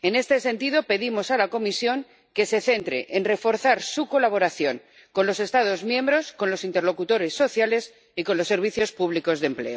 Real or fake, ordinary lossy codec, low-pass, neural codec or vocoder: real; none; none; none